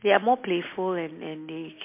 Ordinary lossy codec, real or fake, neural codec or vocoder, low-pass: MP3, 24 kbps; real; none; 3.6 kHz